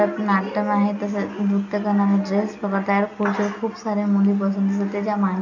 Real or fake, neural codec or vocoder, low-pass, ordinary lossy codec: real; none; 7.2 kHz; none